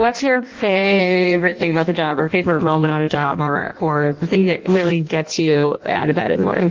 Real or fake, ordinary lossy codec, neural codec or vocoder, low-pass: fake; Opus, 32 kbps; codec, 16 kHz in and 24 kHz out, 0.6 kbps, FireRedTTS-2 codec; 7.2 kHz